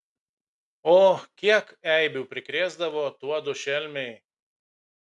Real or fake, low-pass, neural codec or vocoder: real; 10.8 kHz; none